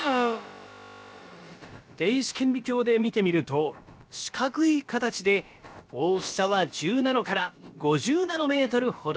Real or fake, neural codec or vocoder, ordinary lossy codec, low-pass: fake; codec, 16 kHz, about 1 kbps, DyCAST, with the encoder's durations; none; none